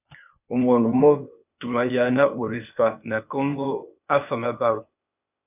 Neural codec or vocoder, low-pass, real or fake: codec, 16 kHz, 0.8 kbps, ZipCodec; 3.6 kHz; fake